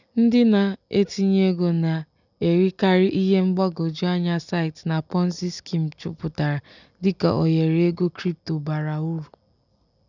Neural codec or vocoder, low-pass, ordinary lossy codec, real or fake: none; 7.2 kHz; none; real